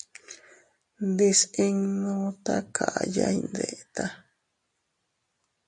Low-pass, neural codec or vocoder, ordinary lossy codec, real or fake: 10.8 kHz; none; MP3, 96 kbps; real